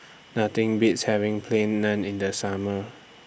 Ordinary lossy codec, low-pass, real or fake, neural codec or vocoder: none; none; real; none